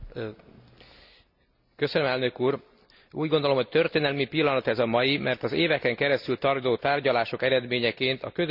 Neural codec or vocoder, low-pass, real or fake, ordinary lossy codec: none; 5.4 kHz; real; none